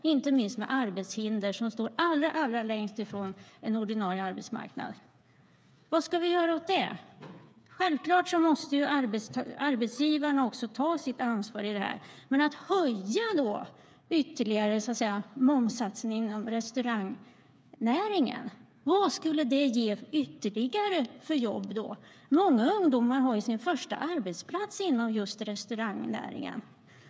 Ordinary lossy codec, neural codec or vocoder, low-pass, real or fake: none; codec, 16 kHz, 8 kbps, FreqCodec, smaller model; none; fake